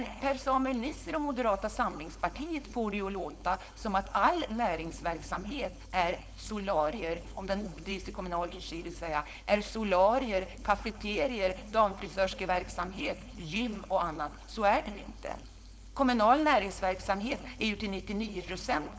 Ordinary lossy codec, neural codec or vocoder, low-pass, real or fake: none; codec, 16 kHz, 4.8 kbps, FACodec; none; fake